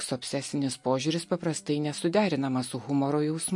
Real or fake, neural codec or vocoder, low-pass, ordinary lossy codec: real; none; 10.8 kHz; MP3, 48 kbps